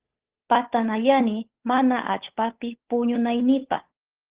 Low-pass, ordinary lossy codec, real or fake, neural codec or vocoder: 3.6 kHz; Opus, 16 kbps; fake; codec, 16 kHz, 8 kbps, FunCodec, trained on Chinese and English, 25 frames a second